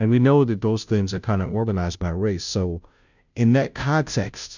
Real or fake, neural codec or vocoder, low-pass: fake; codec, 16 kHz, 0.5 kbps, FunCodec, trained on Chinese and English, 25 frames a second; 7.2 kHz